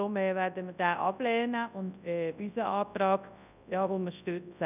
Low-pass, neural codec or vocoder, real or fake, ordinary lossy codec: 3.6 kHz; codec, 24 kHz, 0.9 kbps, WavTokenizer, large speech release; fake; none